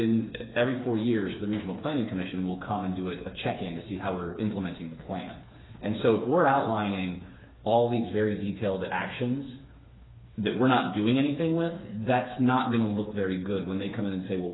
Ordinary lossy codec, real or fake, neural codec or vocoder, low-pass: AAC, 16 kbps; fake; codec, 16 kHz, 4 kbps, FreqCodec, smaller model; 7.2 kHz